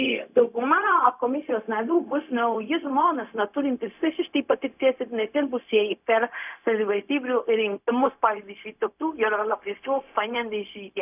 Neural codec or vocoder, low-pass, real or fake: codec, 16 kHz, 0.4 kbps, LongCat-Audio-Codec; 3.6 kHz; fake